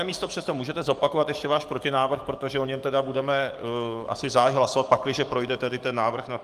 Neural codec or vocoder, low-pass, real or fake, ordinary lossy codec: codec, 44.1 kHz, 7.8 kbps, DAC; 14.4 kHz; fake; Opus, 32 kbps